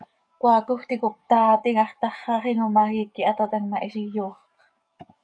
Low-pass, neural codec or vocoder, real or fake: 9.9 kHz; codec, 44.1 kHz, 7.8 kbps, DAC; fake